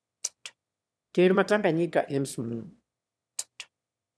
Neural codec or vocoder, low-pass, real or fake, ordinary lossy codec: autoencoder, 22.05 kHz, a latent of 192 numbers a frame, VITS, trained on one speaker; none; fake; none